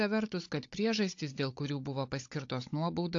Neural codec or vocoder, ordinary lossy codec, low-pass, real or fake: codec, 16 kHz, 16 kbps, FunCodec, trained on Chinese and English, 50 frames a second; AAC, 48 kbps; 7.2 kHz; fake